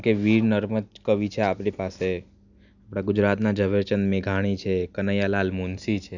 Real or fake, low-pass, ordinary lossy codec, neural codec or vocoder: real; 7.2 kHz; none; none